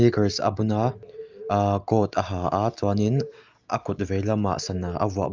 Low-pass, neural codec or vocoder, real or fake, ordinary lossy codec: 7.2 kHz; none; real; Opus, 24 kbps